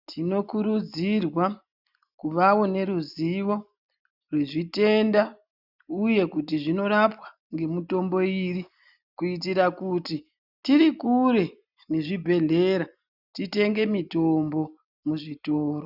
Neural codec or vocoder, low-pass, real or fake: none; 5.4 kHz; real